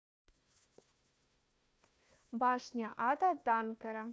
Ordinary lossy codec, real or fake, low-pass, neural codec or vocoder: none; fake; none; codec, 16 kHz, 1 kbps, FunCodec, trained on Chinese and English, 50 frames a second